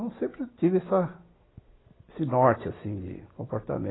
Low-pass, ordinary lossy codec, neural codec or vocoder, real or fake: 7.2 kHz; AAC, 16 kbps; none; real